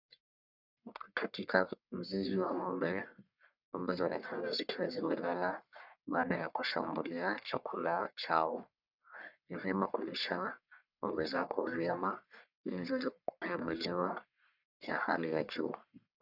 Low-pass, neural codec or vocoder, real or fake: 5.4 kHz; codec, 44.1 kHz, 1.7 kbps, Pupu-Codec; fake